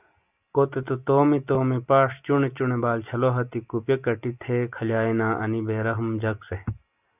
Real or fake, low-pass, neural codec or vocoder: real; 3.6 kHz; none